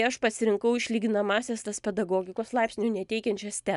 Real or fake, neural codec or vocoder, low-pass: real; none; 10.8 kHz